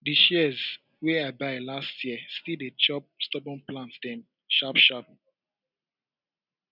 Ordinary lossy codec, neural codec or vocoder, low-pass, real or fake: none; none; 5.4 kHz; real